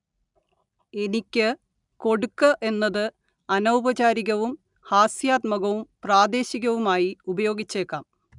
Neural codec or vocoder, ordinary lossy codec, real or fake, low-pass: none; none; real; 10.8 kHz